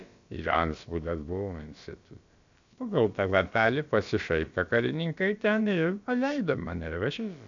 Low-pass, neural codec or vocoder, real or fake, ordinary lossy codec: 7.2 kHz; codec, 16 kHz, about 1 kbps, DyCAST, with the encoder's durations; fake; MP3, 64 kbps